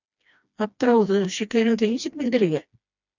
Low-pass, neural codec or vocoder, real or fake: 7.2 kHz; codec, 16 kHz, 2 kbps, FreqCodec, smaller model; fake